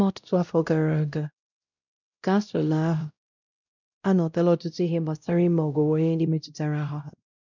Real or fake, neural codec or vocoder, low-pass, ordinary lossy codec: fake; codec, 16 kHz, 0.5 kbps, X-Codec, WavLM features, trained on Multilingual LibriSpeech; 7.2 kHz; none